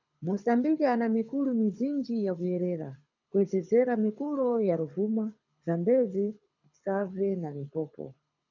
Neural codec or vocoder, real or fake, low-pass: codec, 24 kHz, 6 kbps, HILCodec; fake; 7.2 kHz